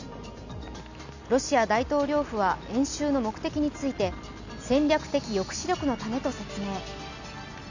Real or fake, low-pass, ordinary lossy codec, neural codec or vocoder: real; 7.2 kHz; none; none